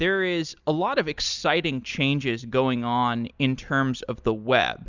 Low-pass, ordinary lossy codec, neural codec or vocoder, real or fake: 7.2 kHz; Opus, 64 kbps; none; real